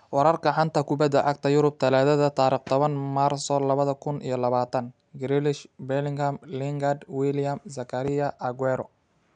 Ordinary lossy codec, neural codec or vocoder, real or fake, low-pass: none; none; real; 9.9 kHz